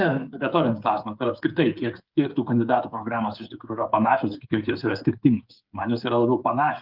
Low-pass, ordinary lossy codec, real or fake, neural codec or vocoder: 5.4 kHz; Opus, 32 kbps; fake; codec, 16 kHz, 8 kbps, FreqCodec, smaller model